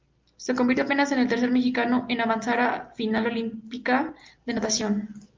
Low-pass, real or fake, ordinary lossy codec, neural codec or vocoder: 7.2 kHz; real; Opus, 32 kbps; none